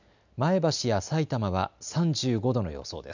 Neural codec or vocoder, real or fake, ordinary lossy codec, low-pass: none; real; none; 7.2 kHz